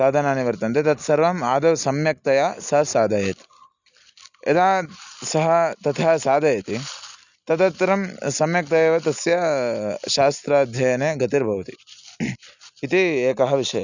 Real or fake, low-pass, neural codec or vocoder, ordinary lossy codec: real; 7.2 kHz; none; none